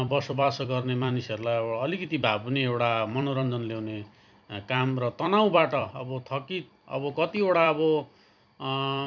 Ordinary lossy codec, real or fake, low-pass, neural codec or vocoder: none; fake; 7.2 kHz; vocoder, 44.1 kHz, 128 mel bands every 256 samples, BigVGAN v2